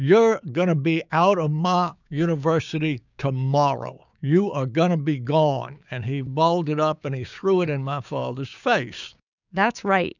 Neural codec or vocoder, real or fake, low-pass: codec, 16 kHz, 6 kbps, DAC; fake; 7.2 kHz